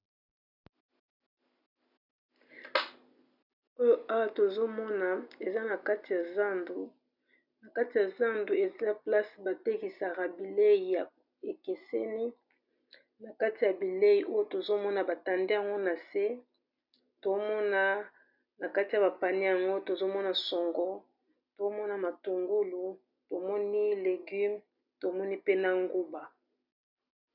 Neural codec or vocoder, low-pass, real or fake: none; 5.4 kHz; real